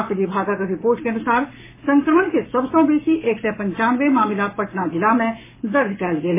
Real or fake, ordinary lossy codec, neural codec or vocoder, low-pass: fake; MP3, 16 kbps; codec, 44.1 kHz, 7.8 kbps, Pupu-Codec; 3.6 kHz